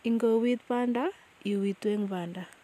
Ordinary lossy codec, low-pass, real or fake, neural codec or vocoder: none; 14.4 kHz; real; none